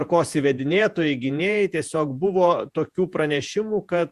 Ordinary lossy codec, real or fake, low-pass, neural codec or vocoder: Opus, 64 kbps; fake; 14.4 kHz; vocoder, 48 kHz, 128 mel bands, Vocos